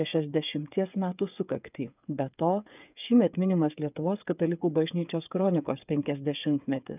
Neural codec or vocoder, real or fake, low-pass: codec, 16 kHz, 8 kbps, FreqCodec, smaller model; fake; 3.6 kHz